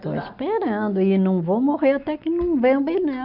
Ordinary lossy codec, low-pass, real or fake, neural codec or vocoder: none; 5.4 kHz; real; none